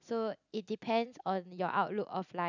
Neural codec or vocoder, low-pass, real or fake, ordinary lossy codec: none; 7.2 kHz; real; none